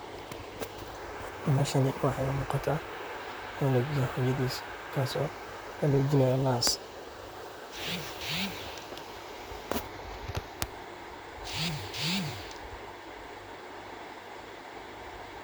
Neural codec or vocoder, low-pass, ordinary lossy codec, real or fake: vocoder, 44.1 kHz, 128 mel bands, Pupu-Vocoder; none; none; fake